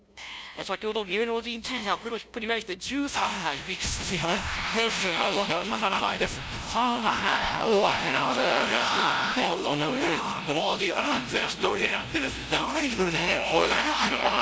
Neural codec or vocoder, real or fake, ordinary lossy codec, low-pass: codec, 16 kHz, 0.5 kbps, FunCodec, trained on LibriTTS, 25 frames a second; fake; none; none